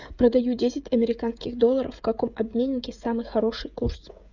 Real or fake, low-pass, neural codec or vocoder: fake; 7.2 kHz; codec, 16 kHz, 16 kbps, FreqCodec, smaller model